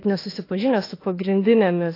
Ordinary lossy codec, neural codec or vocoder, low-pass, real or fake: AAC, 24 kbps; autoencoder, 48 kHz, 32 numbers a frame, DAC-VAE, trained on Japanese speech; 5.4 kHz; fake